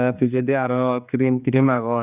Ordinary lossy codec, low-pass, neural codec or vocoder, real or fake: none; 3.6 kHz; codec, 16 kHz, 2 kbps, X-Codec, HuBERT features, trained on general audio; fake